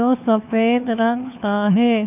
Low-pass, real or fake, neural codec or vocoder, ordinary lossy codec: 3.6 kHz; fake; codec, 16 kHz, 4 kbps, FunCodec, trained on LibriTTS, 50 frames a second; none